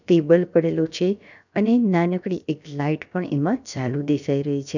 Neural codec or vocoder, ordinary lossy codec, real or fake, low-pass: codec, 16 kHz, about 1 kbps, DyCAST, with the encoder's durations; none; fake; 7.2 kHz